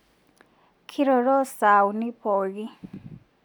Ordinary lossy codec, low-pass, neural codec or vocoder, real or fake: none; 19.8 kHz; none; real